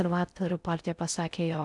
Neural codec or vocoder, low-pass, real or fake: codec, 16 kHz in and 24 kHz out, 0.6 kbps, FocalCodec, streaming, 4096 codes; 10.8 kHz; fake